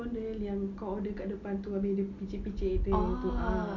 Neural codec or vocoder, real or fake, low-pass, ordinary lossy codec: none; real; 7.2 kHz; none